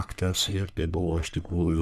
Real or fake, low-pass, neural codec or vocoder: fake; 14.4 kHz; codec, 44.1 kHz, 3.4 kbps, Pupu-Codec